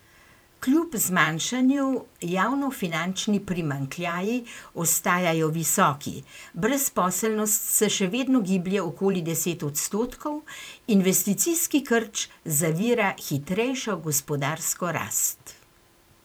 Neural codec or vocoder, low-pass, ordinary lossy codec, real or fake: vocoder, 44.1 kHz, 128 mel bands every 512 samples, BigVGAN v2; none; none; fake